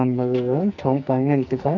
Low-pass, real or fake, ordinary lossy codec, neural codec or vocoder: 7.2 kHz; fake; none; codec, 44.1 kHz, 2.6 kbps, SNAC